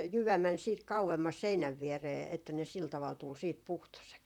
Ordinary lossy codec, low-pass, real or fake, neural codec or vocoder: none; 19.8 kHz; fake; vocoder, 44.1 kHz, 128 mel bands, Pupu-Vocoder